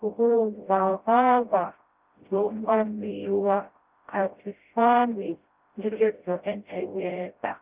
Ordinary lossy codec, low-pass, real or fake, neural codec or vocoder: Opus, 32 kbps; 3.6 kHz; fake; codec, 16 kHz, 0.5 kbps, FreqCodec, smaller model